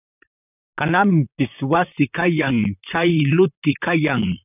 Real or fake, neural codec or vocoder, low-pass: fake; vocoder, 44.1 kHz, 128 mel bands, Pupu-Vocoder; 3.6 kHz